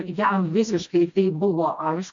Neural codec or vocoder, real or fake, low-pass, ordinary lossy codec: codec, 16 kHz, 1 kbps, FreqCodec, smaller model; fake; 7.2 kHz; MP3, 64 kbps